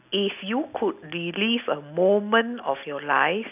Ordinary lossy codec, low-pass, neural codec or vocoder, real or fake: none; 3.6 kHz; none; real